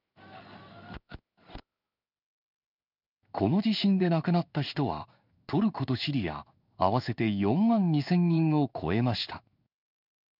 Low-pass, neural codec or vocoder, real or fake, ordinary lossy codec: 5.4 kHz; codec, 16 kHz in and 24 kHz out, 1 kbps, XY-Tokenizer; fake; none